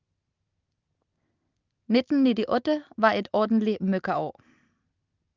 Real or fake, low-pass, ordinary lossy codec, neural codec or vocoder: real; 7.2 kHz; Opus, 24 kbps; none